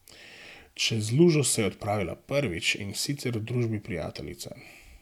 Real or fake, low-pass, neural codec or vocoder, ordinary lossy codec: real; 19.8 kHz; none; none